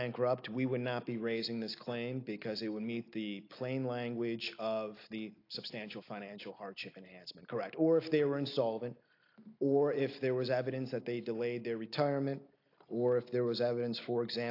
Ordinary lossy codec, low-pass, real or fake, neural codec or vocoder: AAC, 32 kbps; 5.4 kHz; real; none